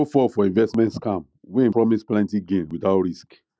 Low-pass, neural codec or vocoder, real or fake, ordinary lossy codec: none; none; real; none